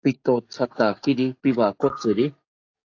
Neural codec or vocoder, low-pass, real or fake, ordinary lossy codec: codec, 44.1 kHz, 7.8 kbps, DAC; 7.2 kHz; fake; AAC, 48 kbps